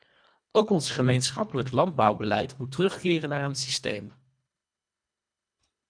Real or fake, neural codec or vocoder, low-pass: fake; codec, 24 kHz, 1.5 kbps, HILCodec; 9.9 kHz